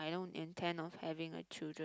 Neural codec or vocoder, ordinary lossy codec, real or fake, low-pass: none; none; real; none